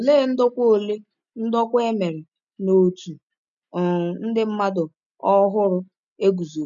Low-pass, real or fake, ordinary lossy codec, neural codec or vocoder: 7.2 kHz; real; none; none